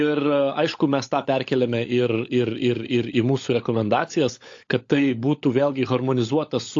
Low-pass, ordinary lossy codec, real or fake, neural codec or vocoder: 7.2 kHz; AAC, 48 kbps; fake; codec, 16 kHz, 16 kbps, FunCodec, trained on LibriTTS, 50 frames a second